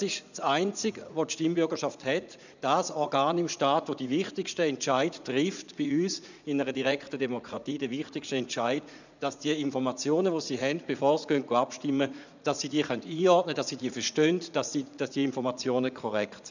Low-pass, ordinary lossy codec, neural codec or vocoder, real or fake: 7.2 kHz; none; vocoder, 44.1 kHz, 80 mel bands, Vocos; fake